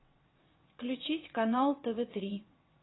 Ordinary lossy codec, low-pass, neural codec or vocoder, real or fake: AAC, 16 kbps; 7.2 kHz; vocoder, 22.05 kHz, 80 mel bands, WaveNeXt; fake